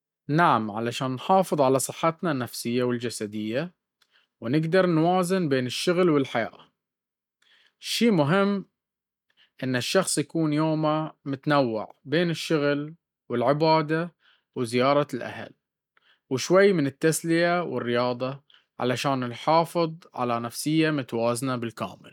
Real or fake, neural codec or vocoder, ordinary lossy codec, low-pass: real; none; none; 19.8 kHz